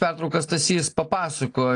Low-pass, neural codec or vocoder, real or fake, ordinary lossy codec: 9.9 kHz; none; real; AAC, 48 kbps